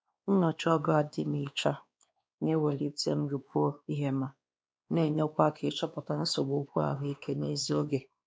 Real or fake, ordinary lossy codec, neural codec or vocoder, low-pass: fake; none; codec, 16 kHz, 2 kbps, X-Codec, WavLM features, trained on Multilingual LibriSpeech; none